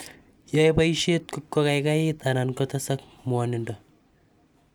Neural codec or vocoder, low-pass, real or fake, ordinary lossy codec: none; none; real; none